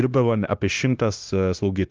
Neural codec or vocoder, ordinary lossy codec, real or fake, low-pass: codec, 16 kHz, 0.9 kbps, LongCat-Audio-Codec; Opus, 24 kbps; fake; 7.2 kHz